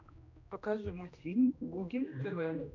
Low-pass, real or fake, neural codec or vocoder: 7.2 kHz; fake; codec, 16 kHz, 1 kbps, X-Codec, HuBERT features, trained on general audio